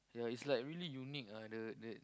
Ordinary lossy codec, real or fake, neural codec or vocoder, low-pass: none; real; none; none